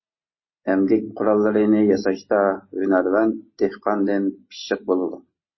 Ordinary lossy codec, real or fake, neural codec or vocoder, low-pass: MP3, 24 kbps; real; none; 7.2 kHz